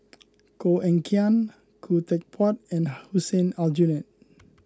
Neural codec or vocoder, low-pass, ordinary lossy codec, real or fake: none; none; none; real